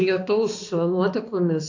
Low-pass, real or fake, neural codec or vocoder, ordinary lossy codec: 7.2 kHz; fake; codec, 16 kHz, 2 kbps, X-Codec, HuBERT features, trained on balanced general audio; AAC, 48 kbps